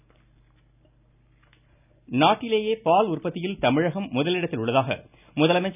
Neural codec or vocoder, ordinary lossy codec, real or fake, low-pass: none; none; real; 3.6 kHz